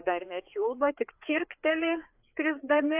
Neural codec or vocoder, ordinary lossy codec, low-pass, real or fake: codec, 16 kHz, 4 kbps, FreqCodec, larger model; AAC, 32 kbps; 3.6 kHz; fake